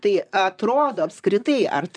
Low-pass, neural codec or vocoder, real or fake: 9.9 kHz; vocoder, 44.1 kHz, 128 mel bands, Pupu-Vocoder; fake